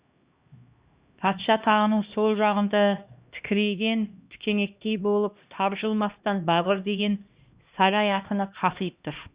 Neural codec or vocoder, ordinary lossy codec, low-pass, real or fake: codec, 16 kHz, 1 kbps, X-Codec, HuBERT features, trained on LibriSpeech; Opus, 64 kbps; 3.6 kHz; fake